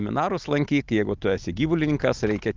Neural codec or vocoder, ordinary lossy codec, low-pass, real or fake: none; Opus, 32 kbps; 7.2 kHz; real